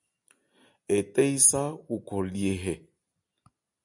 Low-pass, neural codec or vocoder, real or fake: 10.8 kHz; none; real